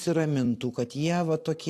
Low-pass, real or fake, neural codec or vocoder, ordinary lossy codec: 14.4 kHz; fake; vocoder, 44.1 kHz, 128 mel bands every 256 samples, BigVGAN v2; AAC, 64 kbps